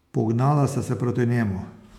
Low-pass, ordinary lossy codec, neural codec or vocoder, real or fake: 19.8 kHz; MP3, 96 kbps; autoencoder, 48 kHz, 128 numbers a frame, DAC-VAE, trained on Japanese speech; fake